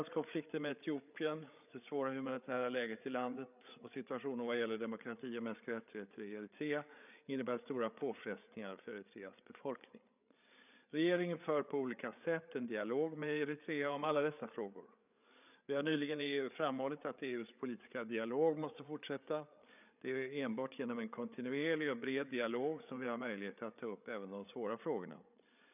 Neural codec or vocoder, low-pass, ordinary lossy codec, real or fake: codec, 16 kHz, 8 kbps, FreqCodec, larger model; 3.6 kHz; none; fake